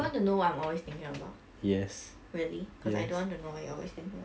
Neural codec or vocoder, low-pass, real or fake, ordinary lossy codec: none; none; real; none